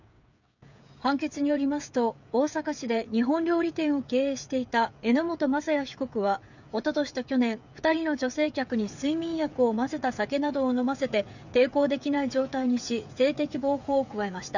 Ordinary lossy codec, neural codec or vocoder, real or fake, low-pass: none; codec, 16 kHz, 8 kbps, FreqCodec, smaller model; fake; 7.2 kHz